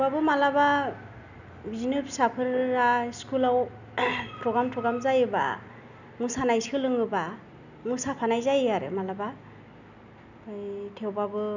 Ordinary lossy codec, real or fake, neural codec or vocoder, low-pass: none; real; none; 7.2 kHz